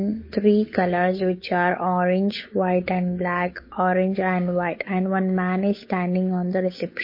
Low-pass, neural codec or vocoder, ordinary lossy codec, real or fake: 5.4 kHz; codec, 16 kHz, 8 kbps, FunCodec, trained on Chinese and English, 25 frames a second; MP3, 24 kbps; fake